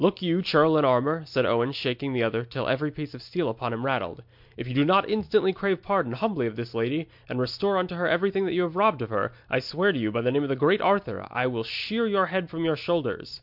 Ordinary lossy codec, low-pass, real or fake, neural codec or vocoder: MP3, 48 kbps; 5.4 kHz; real; none